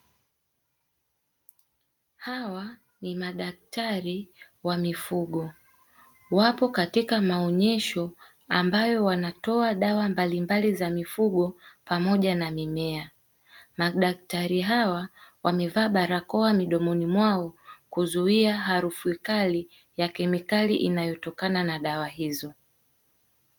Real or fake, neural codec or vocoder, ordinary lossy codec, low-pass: real; none; Opus, 32 kbps; 19.8 kHz